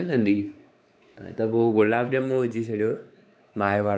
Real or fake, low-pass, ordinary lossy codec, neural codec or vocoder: fake; none; none; codec, 16 kHz, 4 kbps, X-Codec, WavLM features, trained on Multilingual LibriSpeech